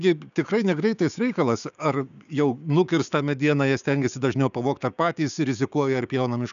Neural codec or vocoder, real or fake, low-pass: codec, 16 kHz, 6 kbps, DAC; fake; 7.2 kHz